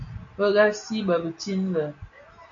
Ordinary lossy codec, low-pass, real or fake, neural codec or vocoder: AAC, 64 kbps; 7.2 kHz; real; none